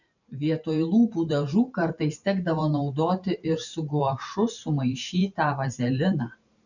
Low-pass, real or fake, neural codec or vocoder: 7.2 kHz; fake; vocoder, 44.1 kHz, 128 mel bands every 512 samples, BigVGAN v2